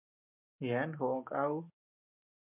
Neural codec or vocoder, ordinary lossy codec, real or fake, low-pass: none; MP3, 32 kbps; real; 3.6 kHz